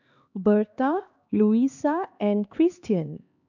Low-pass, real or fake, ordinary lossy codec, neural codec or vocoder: 7.2 kHz; fake; none; codec, 16 kHz, 2 kbps, X-Codec, HuBERT features, trained on LibriSpeech